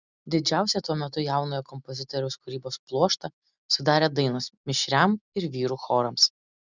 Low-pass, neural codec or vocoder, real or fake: 7.2 kHz; none; real